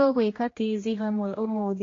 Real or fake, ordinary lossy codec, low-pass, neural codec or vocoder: fake; AAC, 32 kbps; 7.2 kHz; codec, 16 kHz, 1 kbps, X-Codec, HuBERT features, trained on general audio